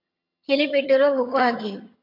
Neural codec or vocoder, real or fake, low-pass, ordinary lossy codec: vocoder, 22.05 kHz, 80 mel bands, HiFi-GAN; fake; 5.4 kHz; AAC, 32 kbps